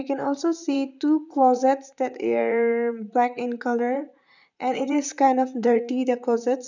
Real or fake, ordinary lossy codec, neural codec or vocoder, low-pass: fake; none; vocoder, 44.1 kHz, 128 mel bands, Pupu-Vocoder; 7.2 kHz